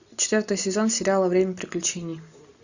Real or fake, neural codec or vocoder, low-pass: real; none; 7.2 kHz